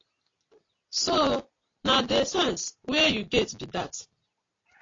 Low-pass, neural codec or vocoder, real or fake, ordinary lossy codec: 7.2 kHz; none; real; AAC, 32 kbps